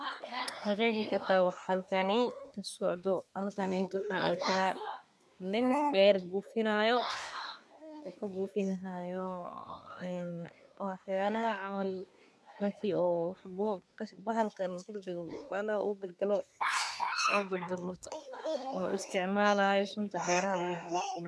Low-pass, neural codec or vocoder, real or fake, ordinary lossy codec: none; codec, 24 kHz, 1 kbps, SNAC; fake; none